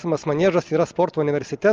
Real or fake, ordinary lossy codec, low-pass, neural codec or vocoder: real; Opus, 24 kbps; 7.2 kHz; none